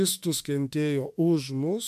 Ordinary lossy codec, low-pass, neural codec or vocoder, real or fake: MP3, 96 kbps; 14.4 kHz; autoencoder, 48 kHz, 32 numbers a frame, DAC-VAE, trained on Japanese speech; fake